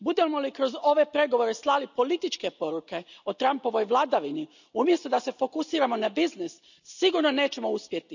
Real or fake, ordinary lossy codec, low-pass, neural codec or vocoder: real; none; 7.2 kHz; none